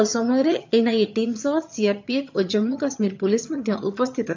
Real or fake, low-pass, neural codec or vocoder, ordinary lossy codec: fake; 7.2 kHz; vocoder, 22.05 kHz, 80 mel bands, HiFi-GAN; MP3, 64 kbps